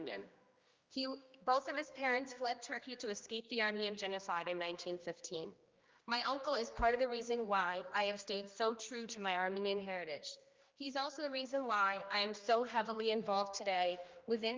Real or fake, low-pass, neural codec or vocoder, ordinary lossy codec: fake; 7.2 kHz; codec, 16 kHz, 1 kbps, X-Codec, HuBERT features, trained on general audio; Opus, 32 kbps